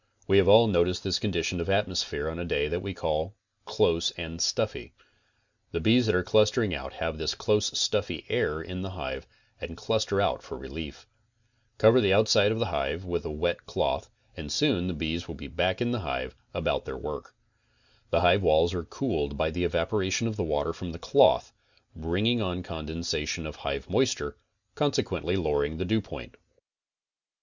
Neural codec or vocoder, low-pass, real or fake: none; 7.2 kHz; real